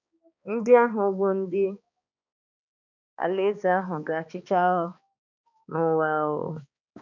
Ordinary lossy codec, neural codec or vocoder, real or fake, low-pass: none; codec, 16 kHz, 2 kbps, X-Codec, HuBERT features, trained on balanced general audio; fake; 7.2 kHz